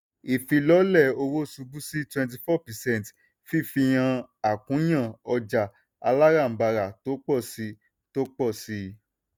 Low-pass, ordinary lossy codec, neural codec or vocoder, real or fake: none; none; none; real